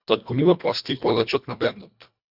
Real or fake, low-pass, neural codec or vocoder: fake; 5.4 kHz; codec, 24 kHz, 1.5 kbps, HILCodec